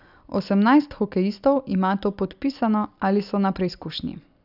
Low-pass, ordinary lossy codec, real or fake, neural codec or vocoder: 5.4 kHz; none; real; none